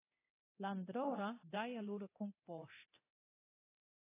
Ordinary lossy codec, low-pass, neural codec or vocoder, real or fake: AAC, 16 kbps; 3.6 kHz; codec, 24 kHz, 0.9 kbps, DualCodec; fake